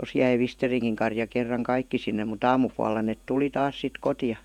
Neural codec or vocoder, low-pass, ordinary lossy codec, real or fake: vocoder, 48 kHz, 128 mel bands, Vocos; 19.8 kHz; none; fake